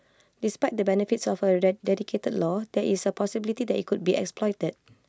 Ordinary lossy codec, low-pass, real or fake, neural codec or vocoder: none; none; real; none